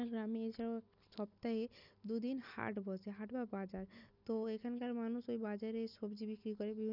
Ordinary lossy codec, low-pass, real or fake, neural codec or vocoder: none; 5.4 kHz; real; none